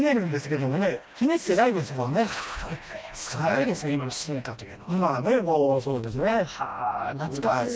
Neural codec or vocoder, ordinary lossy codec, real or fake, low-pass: codec, 16 kHz, 1 kbps, FreqCodec, smaller model; none; fake; none